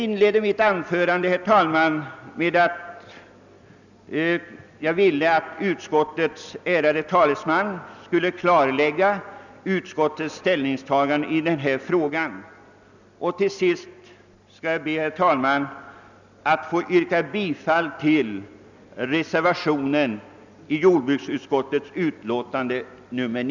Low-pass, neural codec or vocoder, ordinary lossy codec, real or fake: 7.2 kHz; none; none; real